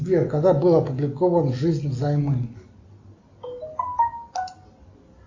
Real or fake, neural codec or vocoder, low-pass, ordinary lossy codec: real; none; 7.2 kHz; AAC, 48 kbps